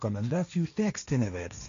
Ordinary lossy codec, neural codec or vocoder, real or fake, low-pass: MP3, 64 kbps; codec, 16 kHz, 1.1 kbps, Voila-Tokenizer; fake; 7.2 kHz